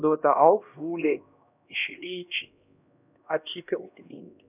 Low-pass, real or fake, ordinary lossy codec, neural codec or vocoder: 3.6 kHz; fake; none; codec, 16 kHz, 1 kbps, X-Codec, HuBERT features, trained on LibriSpeech